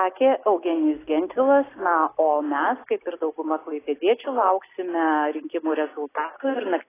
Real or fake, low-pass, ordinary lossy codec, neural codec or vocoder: real; 3.6 kHz; AAC, 16 kbps; none